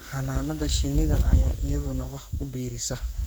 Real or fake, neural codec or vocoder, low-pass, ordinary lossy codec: fake; codec, 44.1 kHz, 2.6 kbps, SNAC; none; none